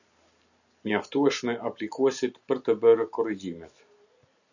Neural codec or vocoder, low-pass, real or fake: none; 7.2 kHz; real